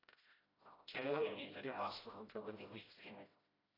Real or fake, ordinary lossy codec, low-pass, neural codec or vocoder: fake; AAC, 24 kbps; 5.4 kHz; codec, 16 kHz, 0.5 kbps, FreqCodec, smaller model